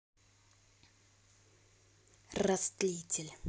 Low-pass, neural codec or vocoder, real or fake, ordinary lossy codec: none; none; real; none